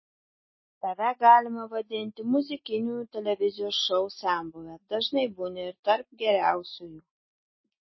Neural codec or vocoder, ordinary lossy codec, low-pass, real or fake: none; MP3, 24 kbps; 7.2 kHz; real